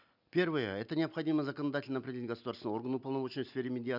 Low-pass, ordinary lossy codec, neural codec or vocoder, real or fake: 5.4 kHz; none; none; real